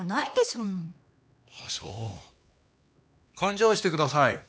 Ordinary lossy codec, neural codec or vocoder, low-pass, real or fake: none; codec, 16 kHz, 2 kbps, X-Codec, HuBERT features, trained on LibriSpeech; none; fake